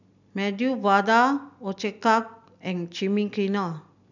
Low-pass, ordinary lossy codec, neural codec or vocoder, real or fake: 7.2 kHz; none; none; real